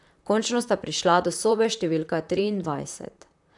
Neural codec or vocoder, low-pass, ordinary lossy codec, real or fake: vocoder, 44.1 kHz, 128 mel bands, Pupu-Vocoder; 10.8 kHz; none; fake